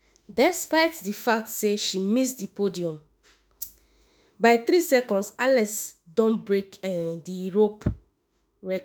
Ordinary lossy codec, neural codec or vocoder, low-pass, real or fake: none; autoencoder, 48 kHz, 32 numbers a frame, DAC-VAE, trained on Japanese speech; none; fake